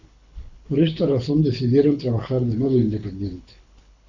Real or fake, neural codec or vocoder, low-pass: fake; codec, 24 kHz, 6 kbps, HILCodec; 7.2 kHz